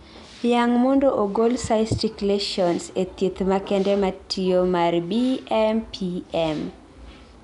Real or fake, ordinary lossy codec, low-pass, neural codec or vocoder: real; none; 10.8 kHz; none